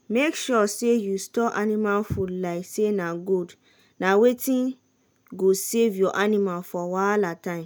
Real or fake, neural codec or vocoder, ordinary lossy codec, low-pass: real; none; none; none